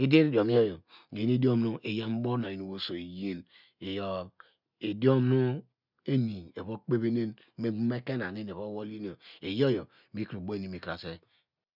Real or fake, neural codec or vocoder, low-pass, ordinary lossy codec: real; none; 5.4 kHz; none